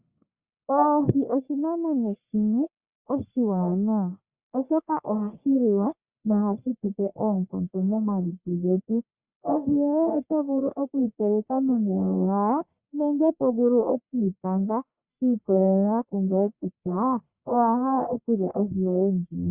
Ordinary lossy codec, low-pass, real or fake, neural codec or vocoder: Opus, 64 kbps; 3.6 kHz; fake; codec, 44.1 kHz, 1.7 kbps, Pupu-Codec